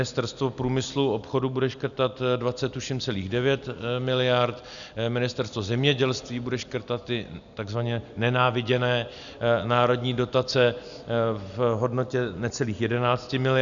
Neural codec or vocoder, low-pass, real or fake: none; 7.2 kHz; real